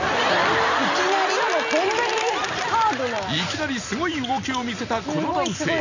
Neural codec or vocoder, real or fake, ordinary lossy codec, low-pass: none; real; none; 7.2 kHz